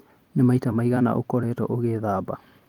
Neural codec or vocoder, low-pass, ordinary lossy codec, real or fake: vocoder, 44.1 kHz, 128 mel bands every 256 samples, BigVGAN v2; 19.8 kHz; Opus, 24 kbps; fake